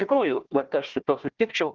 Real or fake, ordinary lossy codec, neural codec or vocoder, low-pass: fake; Opus, 16 kbps; codec, 16 kHz, 1 kbps, FunCodec, trained on Chinese and English, 50 frames a second; 7.2 kHz